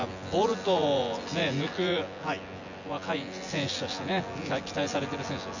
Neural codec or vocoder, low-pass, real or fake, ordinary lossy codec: vocoder, 24 kHz, 100 mel bands, Vocos; 7.2 kHz; fake; none